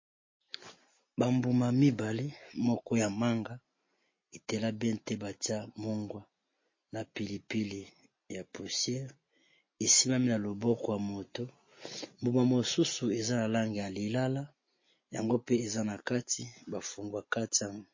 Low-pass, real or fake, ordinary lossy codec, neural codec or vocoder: 7.2 kHz; real; MP3, 32 kbps; none